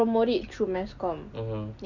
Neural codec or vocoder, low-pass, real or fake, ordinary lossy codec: none; 7.2 kHz; real; none